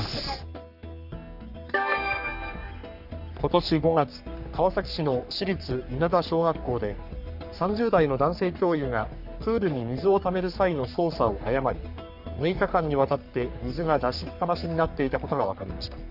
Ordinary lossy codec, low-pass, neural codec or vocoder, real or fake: none; 5.4 kHz; codec, 44.1 kHz, 3.4 kbps, Pupu-Codec; fake